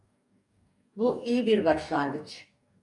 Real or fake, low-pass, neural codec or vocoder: fake; 10.8 kHz; codec, 44.1 kHz, 2.6 kbps, DAC